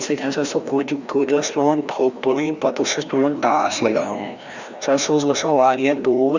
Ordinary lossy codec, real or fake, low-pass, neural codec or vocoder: Opus, 64 kbps; fake; 7.2 kHz; codec, 16 kHz, 1 kbps, FreqCodec, larger model